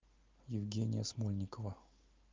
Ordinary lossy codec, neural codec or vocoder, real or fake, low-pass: Opus, 24 kbps; none; real; 7.2 kHz